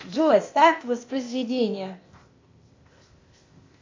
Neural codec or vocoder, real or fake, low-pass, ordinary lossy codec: codec, 16 kHz, 0.8 kbps, ZipCodec; fake; 7.2 kHz; MP3, 48 kbps